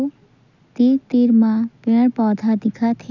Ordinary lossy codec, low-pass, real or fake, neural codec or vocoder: none; 7.2 kHz; real; none